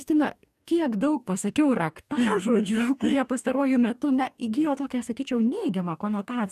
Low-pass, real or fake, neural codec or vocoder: 14.4 kHz; fake; codec, 44.1 kHz, 2.6 kbps, DAC